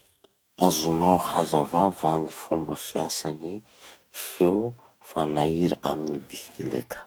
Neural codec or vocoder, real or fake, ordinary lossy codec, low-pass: codec, 44.1 kHz, 2.6 kbps, DAC; fake; none; none